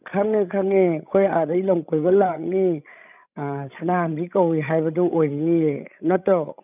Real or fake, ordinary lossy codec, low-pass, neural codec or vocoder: fake; none; 3.6 kHz; codec, 16 kHz, 16 kbps, FreqCodec, larger model